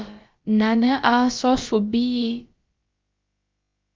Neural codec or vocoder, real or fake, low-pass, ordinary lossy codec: codec, 16 kHz, about 1 kbps, DyCAST, with the encoder's durations; fake; 7.2 kHz; Opus, 24 kbps